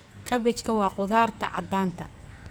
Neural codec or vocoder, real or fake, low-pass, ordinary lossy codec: codec, 44.1 kHz, 2.6 kbps, SNAC; fake; none; none